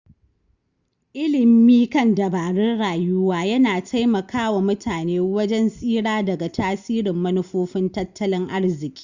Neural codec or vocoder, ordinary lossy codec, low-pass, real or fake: none; Opus, 64 kbps; 7.2 kHz; real